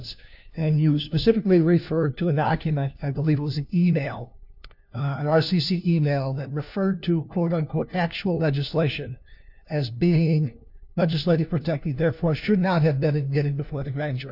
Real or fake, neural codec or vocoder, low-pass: fake; codec, 16 kHz, 1 kbps, FunCodec, trained on LibriTTS, 50 frames a second; 5.4 kHz